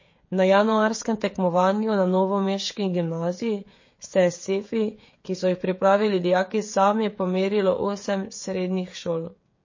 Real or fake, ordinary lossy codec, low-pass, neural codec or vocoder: fake; MP3, 32 kbps; 7.2 kHz; codec, 16 kHz, 16 kbps, FreqCodec, smaller model